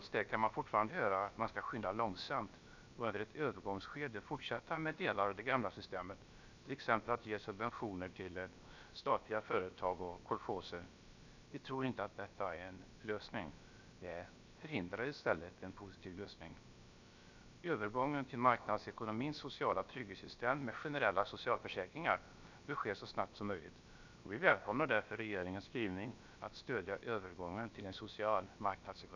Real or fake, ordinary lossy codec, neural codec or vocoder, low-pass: fake; none; codec, 16 kHz, about 1 kbps, DyCAST, with the encoder's durations; 7.2 kHz